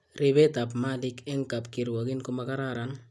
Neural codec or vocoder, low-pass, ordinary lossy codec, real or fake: none; none; none; real